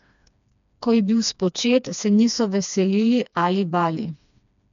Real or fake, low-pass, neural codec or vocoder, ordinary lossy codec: fake; 7.2 kHz; codec, 16 kHz, 2 kbps, FreqCodec, smaller model; none